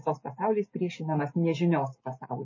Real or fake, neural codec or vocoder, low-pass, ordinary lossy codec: real; none; 7.2 kHz; MP3, 32 kbps